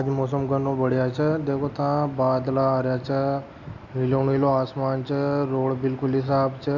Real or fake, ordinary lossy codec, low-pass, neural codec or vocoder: real; Opus, 64 kbps; 7.2 kHz; none